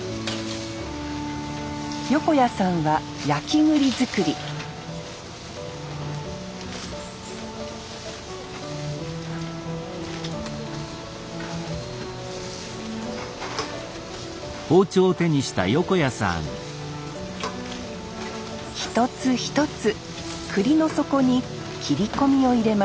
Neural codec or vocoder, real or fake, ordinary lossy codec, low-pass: none; real; none; none